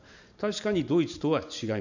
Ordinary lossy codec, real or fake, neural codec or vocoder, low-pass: none; fake; vocoder, 44.1 kHz, 128 mel bands every 512 samples, BigVGAN v2; 7.2 kHz